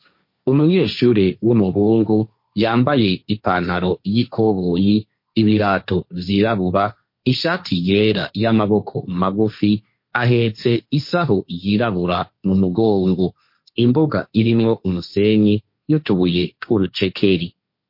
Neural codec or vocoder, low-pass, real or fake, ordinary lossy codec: codec, 16 kHz, 1.1 kbps, Voila-Tokenizer; 5.4 kHz; fake; MP3, 32 kbps